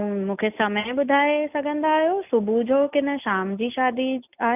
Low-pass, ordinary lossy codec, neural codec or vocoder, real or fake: 3.6 kHz; none; none; real